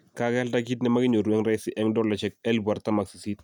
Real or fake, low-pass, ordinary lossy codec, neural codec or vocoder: real; 19.8 kHz; none; none